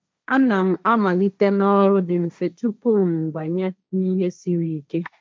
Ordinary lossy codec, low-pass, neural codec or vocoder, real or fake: none; none; codec, 16 kHz, 1.1 kbps, Voila-Tokenizer; fake